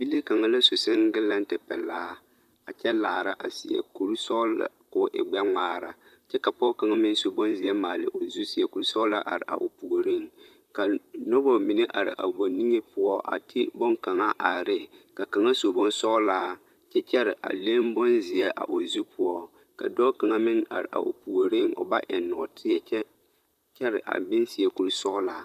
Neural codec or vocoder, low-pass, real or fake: vocoder, 44.1 kHz, 128 mel bands, Pupu-Vocoder; 14.4 kHz; fake